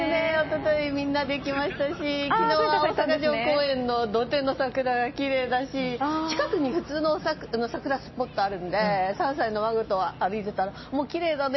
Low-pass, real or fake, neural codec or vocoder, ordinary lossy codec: 7.2 kHz; real; none; MP3, 24 kbps